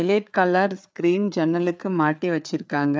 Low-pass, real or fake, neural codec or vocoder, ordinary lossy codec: none; fake; codec, 16 kHz, 4 kbps, FreqCodec, larger model; none